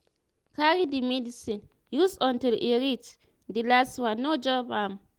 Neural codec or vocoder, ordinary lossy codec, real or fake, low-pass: none; Opus, 24 kbps; real; 19.8 kHz